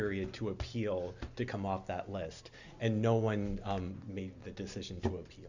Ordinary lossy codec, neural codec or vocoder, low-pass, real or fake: Opus, 64 kbps; none; 7.2 kHz; real